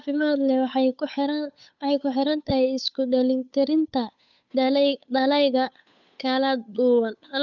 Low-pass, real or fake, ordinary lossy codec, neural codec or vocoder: 7.2 kHz; fake; none; codec, 16 kHz, 8 kbps, FunCodec, trained on Chinese and English, 25 frames a second